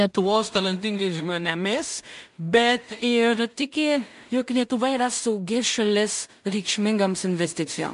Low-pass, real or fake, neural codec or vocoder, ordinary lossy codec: 10.8 kHz; fake; codec, 16 kHz in and 24 kHz out, 0.4 kbps, LongCat-Audio-Codec, two codebook decoder; MP3, 64 kbps